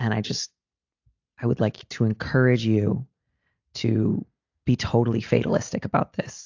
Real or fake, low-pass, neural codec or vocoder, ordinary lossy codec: real; 7.2 kHz; none; AAC, 48 kbps